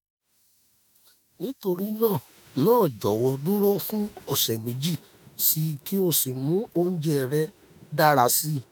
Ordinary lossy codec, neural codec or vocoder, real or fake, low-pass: none; autoencoder, 48 kHz, 32 numbers a frame, DAC-VAE, trained on Japanese speech; fake; none